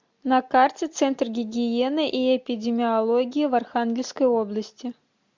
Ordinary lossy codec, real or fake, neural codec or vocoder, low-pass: MP3, 64 kbps; real; none; 7.2 kHz